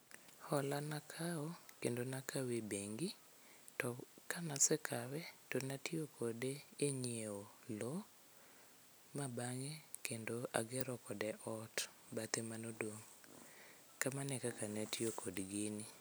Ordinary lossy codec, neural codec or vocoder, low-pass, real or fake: none; none; none; real